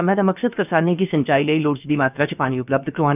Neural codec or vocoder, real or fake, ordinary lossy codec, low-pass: codec, 16 kHz, about 1 kbps, DyCAST, with the encoder's durations; fake; none; 3.6 kHz